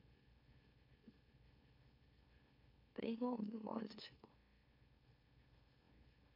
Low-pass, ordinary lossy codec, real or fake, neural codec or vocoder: 5.4 kHz; MP3, 48 kbps; fake; autoencoder, 44.1 kHz, a latent of 192 numbers a frame, MeloTTS